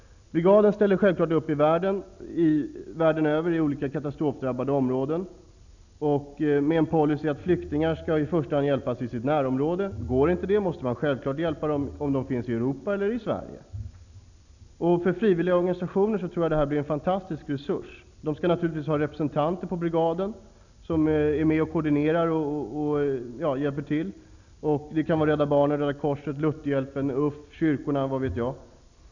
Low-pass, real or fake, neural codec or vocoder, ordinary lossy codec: 7.2 kHz; real; none; none